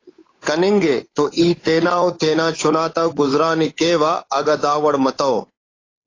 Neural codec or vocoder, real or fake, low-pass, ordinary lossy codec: codec, 16 kHz, 8 kbps, FunCodec, trained on Chinese and English, 25 frames a second; fake; 7.2 kHz; AAC, 32 kbps